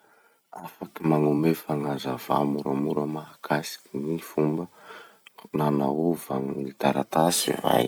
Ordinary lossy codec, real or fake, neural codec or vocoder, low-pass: none; fake; vocoder, 44.1 kHz, 128 mel bands every 256 samples, BigVGAN v2; none